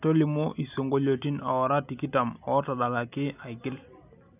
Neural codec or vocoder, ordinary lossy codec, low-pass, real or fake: none; none; 3.6 kHz; real